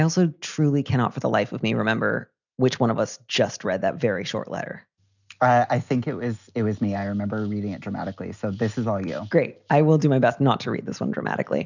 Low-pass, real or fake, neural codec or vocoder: 7.2 kHz; real; none